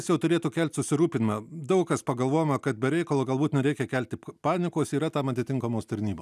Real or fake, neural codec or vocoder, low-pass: real; none; 14.4 kHz